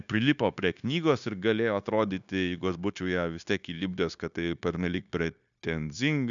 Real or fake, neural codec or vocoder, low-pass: fake; codec, 16 kHz, 0.9 kbps, LongCat-Audio-Codec; 7.2 kHz